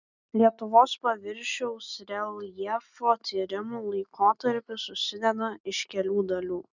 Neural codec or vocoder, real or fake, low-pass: none; real; 7.2 kHz